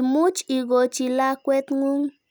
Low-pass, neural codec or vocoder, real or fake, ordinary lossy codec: none; none; real; none